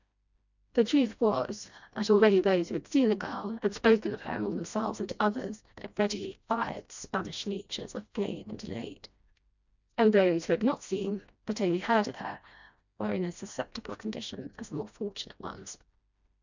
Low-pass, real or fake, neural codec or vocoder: 7.2 kHz; fake; codec, 16 kHz, 1 kbps, FreqCodec, smaller model